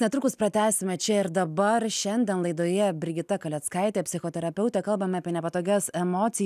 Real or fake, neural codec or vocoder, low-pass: real; none; 14.4 kHz